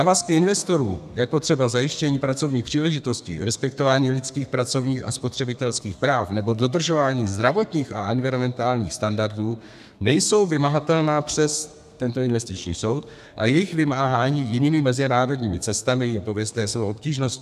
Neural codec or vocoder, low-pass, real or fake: codec, 32 kHz, 1.9 kbps, SNAC; 14.4 kHz; fake